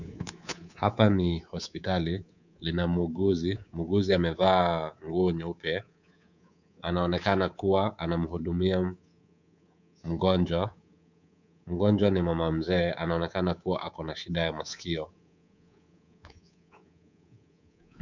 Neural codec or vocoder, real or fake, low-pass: codec, 24 kHz, 3.1 kbps, DualCodec; fake; 7.2 kHz